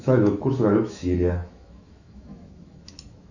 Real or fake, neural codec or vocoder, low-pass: fake; autoencoder, 48 kHz, 128 numbers a frame, DAC-VAE, trained on Japanese speech; 7.2 kHz